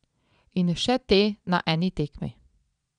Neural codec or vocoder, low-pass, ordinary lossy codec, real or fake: vocoder, 22.05 kHz, 80 mel bands, Vocos; 9.9 kHz; none; fake